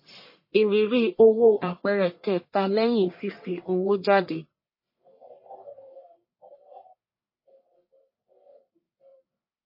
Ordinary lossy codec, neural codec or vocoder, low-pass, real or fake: MP3, 24 kbps; codec, 44.1 kHz, 1.7 kbps, Pupu-Codec; 5.4 kHz; fake